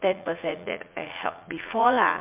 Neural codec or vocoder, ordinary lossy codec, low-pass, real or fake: vocoder, 44.1 kHz, 80 mel bands, Vocos; MP3, 32 kbps; 3.6 kHz; fake